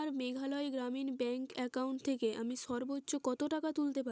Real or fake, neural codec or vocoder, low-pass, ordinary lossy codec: real; none; none; none